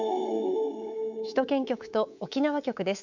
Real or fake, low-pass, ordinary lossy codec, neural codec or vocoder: fake; 7.2 kHz; none; codec, 24 kHz, 3.1 kbps, DualCodec